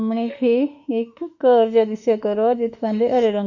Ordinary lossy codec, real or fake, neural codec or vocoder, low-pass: none; fake; autoencoder, 48 kHz, 32 numbers a frame, DAC-VAE, trained on Japanese speech; 7.2 kHz